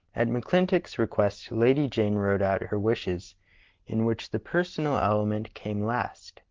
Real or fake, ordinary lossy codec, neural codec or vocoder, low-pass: real; Opus, 16 kbps; none; 7.2 kHz